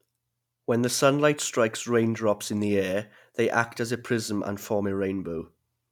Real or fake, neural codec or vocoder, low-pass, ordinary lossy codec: real; none; 19.8 kHz; none